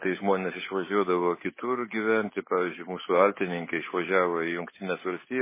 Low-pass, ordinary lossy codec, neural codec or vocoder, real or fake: 3.6 kHz; MP3, 16 kbps; codec, 16 kHz, 8 kbps, FunCodec, trained on Chinese and English, 25 frames a second; fake